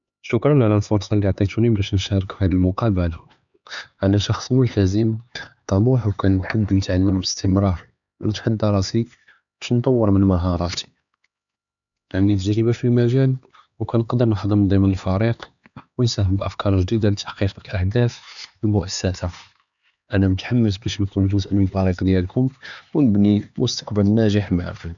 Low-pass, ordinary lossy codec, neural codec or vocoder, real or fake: 7.2 kHz; none; codec, 16 kHz, 4 kbps, X-Codec, HuBERT features, trained on LibriSpeech; fake